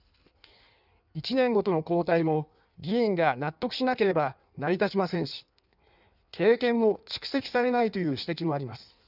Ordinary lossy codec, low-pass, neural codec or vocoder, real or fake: none; 5.4 kHz; codec, 16 kHz in and 24 kHz out, 1.1 kbps, FireRedTTS-2 codec; fake